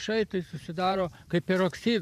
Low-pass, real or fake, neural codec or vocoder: 14.4 kHz; fake; vocoder, 44.1 kHz, 128 mel bands every 512 samples, BigVGAN v2